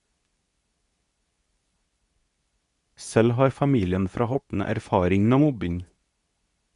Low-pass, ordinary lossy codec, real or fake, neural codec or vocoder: 10.8 kHz; none; fake; codec, 24 kHz, 0.9 kbps, WavTokenizer, medium speech release version 2